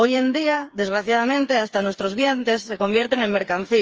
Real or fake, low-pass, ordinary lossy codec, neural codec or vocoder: fake; 7.2 kHz; Opus, 24 kbps; codec, 16 kHz, 4 kbps, FreqCodec, smaller model